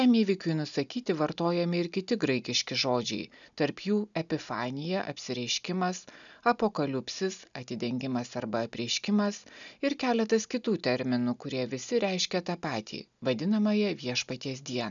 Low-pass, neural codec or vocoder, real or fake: 7.2 kHz; none; real